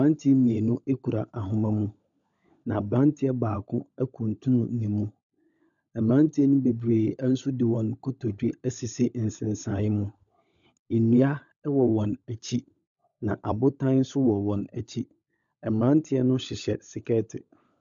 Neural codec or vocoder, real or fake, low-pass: codec, 16 kHz, 16 kbps, FunCodec, trained on LibriTTS, 50 frames a second; fake; 7.2 kHz